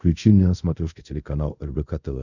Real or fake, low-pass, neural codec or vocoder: fake; 7.2 kHz; codec, 24 kHz, 0.5 kbps, DualCodec